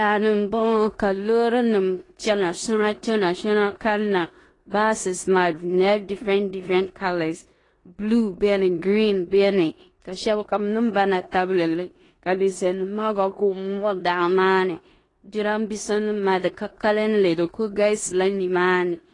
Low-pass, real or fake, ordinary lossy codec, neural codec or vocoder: 10.8 kHz; fake; AAC, 32 kbps; codec, 16 kHz in and 24 kHz out, 0.9 kbps, LongCat-Audio-Codec, four codebook decoder